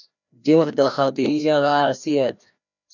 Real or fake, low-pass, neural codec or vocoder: fake; 7.2 kHz; codec, 16 kHz, 1 kbps, FreqCodec, larger model